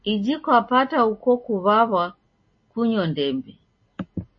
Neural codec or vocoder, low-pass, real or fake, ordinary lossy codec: none; 7.2 kHz; real; MP3, 32 kbps